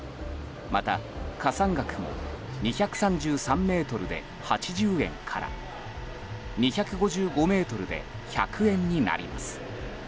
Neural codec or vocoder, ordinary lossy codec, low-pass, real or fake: none; none; none; real